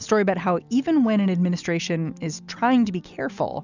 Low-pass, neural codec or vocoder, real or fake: 7.2 kHz; none; real